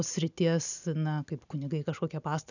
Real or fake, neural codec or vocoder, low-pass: real; none; 7.2 kHz